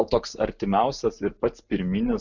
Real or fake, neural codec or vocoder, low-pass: real; none; 7.2 kHz